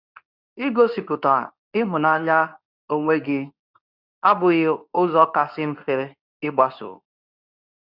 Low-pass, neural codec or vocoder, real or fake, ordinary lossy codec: 5.4 kHz; codec, 24 kHz, 0.9 kbps, WavTokenizer, medium speech release version 2; fake; none